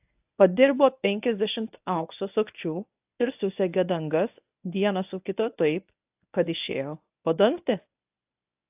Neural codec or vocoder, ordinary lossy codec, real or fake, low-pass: codec, 24 kHz, 0.9 kbps, WavTokenizer, medium speech release version 1; AAC, 32 kbps; fake; 3.6 kHz